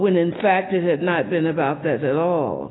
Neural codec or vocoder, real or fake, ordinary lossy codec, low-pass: none; real; AAC, 16 kbps; 7.2 kHz